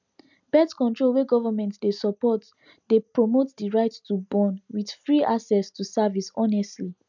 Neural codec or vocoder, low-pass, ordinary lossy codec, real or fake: none; 7.2 kHz; none; real